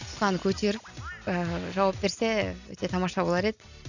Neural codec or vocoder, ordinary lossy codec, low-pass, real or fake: none; none; 7.2 kHz; real